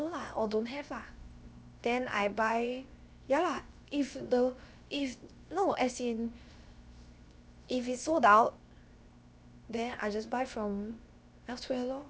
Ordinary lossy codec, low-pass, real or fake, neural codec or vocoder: none; none; fake; codec, 16 kHz, 0.7 kbps, FocalCodec